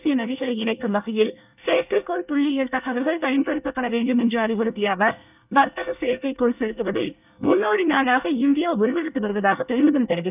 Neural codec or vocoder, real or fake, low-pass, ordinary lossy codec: codec, 24 kHz, 1 kbps, SNAC; fake; 3.6 kHz; none